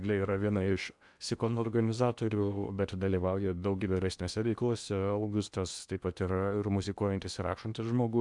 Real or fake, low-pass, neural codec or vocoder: fake; 10.8 kHz; codec, 16 kHz in and 24 kHz out, 0.8 kbps, FocalCodec, streaming, 65536 codes